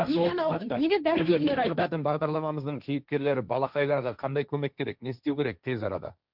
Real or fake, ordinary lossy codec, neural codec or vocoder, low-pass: fake; none; codec, 16 kHz, 1.1 kbps, Voila-Tokenizer; 5.4 kHz